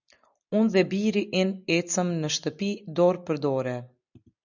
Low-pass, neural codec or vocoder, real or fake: 7.2 kHz; none; real